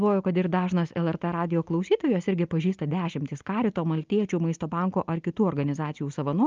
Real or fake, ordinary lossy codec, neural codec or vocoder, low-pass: real; Opus, 24 kbps; none; 7.2 kHz